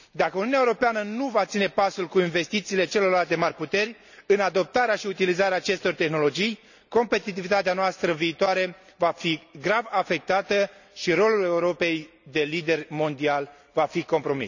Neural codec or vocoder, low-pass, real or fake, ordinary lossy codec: none; 7.2 kHz; real; none